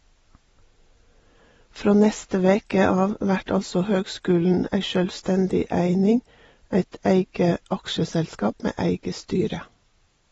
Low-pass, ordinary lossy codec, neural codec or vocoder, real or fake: 19.8 kHz; AAC, 24 kbps; none; real